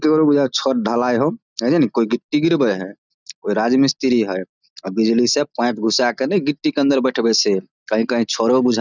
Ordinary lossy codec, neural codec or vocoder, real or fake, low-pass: none; none; real; 7.2 kHz